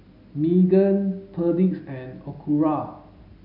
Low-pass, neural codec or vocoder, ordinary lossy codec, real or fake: 5.4 kHz; none; none; real